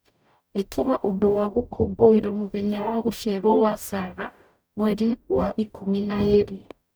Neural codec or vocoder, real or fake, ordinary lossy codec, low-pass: codec, 44.1 kHz, 0.9 kbps, DAC; fake; none; none